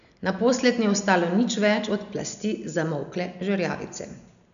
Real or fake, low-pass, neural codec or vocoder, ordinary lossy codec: real; 7.2 kHz; none; none